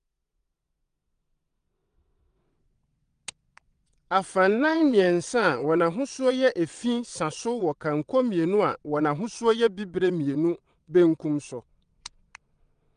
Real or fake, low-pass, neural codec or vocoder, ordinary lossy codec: fake; 9.9 kHz; vocoder, 22.05 kHz, 80 mel bands, WaveNeXt; Opus, 32 kbps